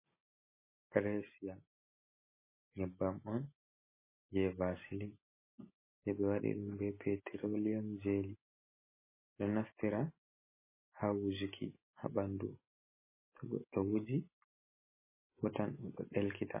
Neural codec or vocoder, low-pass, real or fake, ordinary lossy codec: none; 3.6 kHz; real; MP3, 16 kbps